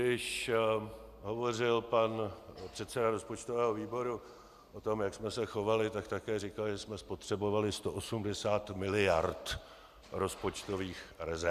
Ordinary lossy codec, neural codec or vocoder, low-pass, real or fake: Opus, 64 kbps; none; 14.4 kHz; real